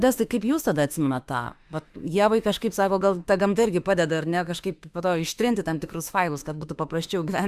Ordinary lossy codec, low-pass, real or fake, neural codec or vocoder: Opus, 64 kbps; 14.4 kHz; fake; autoencoder, 48 kHz, 32 numbers a frame, DAC-VAE, trained on Japanese speech